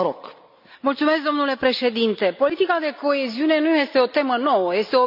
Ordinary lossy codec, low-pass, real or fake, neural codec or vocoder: none; 5.4 kHz; real; none